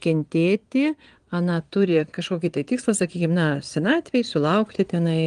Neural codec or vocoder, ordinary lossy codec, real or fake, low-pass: vocoder, 22.05 kHz, 80 mel bands, Vocos; Opus, 24 kbps; fake; 9.9 kHz